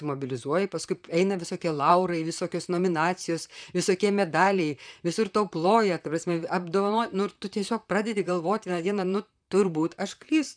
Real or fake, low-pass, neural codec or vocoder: fake; 9.9 kHz; vocoder, 44.1 kHz, 128 mel bands, Pupu-Vocoder